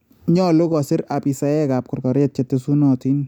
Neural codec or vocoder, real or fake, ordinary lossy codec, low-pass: none; real; none; 19.8 kHz